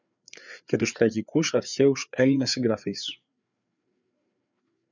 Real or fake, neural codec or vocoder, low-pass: fake; codec, 16 kHz, 4 kbps, FreqCodec, larger model; 7.2 kHz